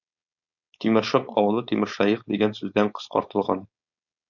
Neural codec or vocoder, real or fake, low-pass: codec, 16 kHz, 4.8 kbps, FACodec; fake; 7.2 kHz